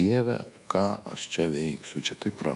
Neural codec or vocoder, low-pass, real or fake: codec, 24 kHz, 1.2 kbps, DualCodec; 10.8 kHz; fake